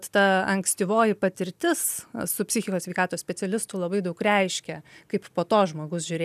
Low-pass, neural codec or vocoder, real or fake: 14.4 kHz; none; real